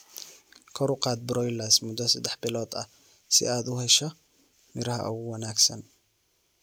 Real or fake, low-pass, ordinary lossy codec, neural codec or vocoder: real; none; none; none